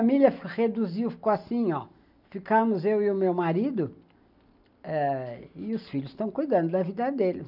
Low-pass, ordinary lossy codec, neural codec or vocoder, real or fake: 5.4 kHz; none; none; real